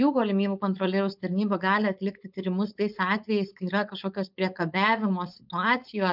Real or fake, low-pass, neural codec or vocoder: fake; 5.4 kHz; codec, 16 kHz, 4.8 kbps, FACodec